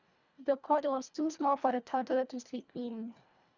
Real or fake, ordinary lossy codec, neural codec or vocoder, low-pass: fake; none; codec, 24 kHz, 1.5 kbps, HILCodec; 7.2 kHz